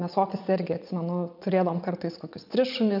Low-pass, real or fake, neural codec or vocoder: 5.4 kHz; fake; vocoder, 44.1 kHz, 80 mel bands, Vocos